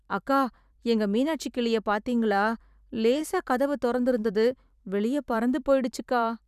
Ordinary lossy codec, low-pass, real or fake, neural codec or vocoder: none; 14.4 kHz; fake; vocoder, 44.1 kHz, 128 mel bands every 512 samples, BigVGAN v2